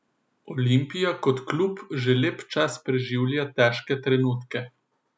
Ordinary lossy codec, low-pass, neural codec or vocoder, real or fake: none; none; none; real